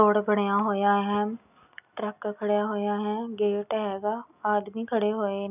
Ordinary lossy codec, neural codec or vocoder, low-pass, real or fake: none; none; 3.6 kHz; real